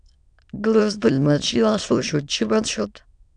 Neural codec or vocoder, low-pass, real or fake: autoencoder, 22.05 kHz, a latent of 192 numbers a frame, VITS, trained on many speakers; 9.9 kHz; fake